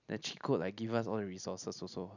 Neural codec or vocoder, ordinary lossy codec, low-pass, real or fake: none; none; 7.2 kHz; real